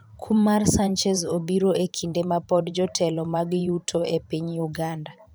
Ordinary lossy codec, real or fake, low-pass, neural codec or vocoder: none; fake; none; vocoder, 44.1 kHz, 128 mel bands every 512 samples, BigVGAN v2